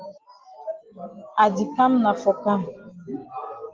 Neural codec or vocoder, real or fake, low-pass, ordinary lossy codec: none; real; 7.2 kHz; Opus, 16 kbps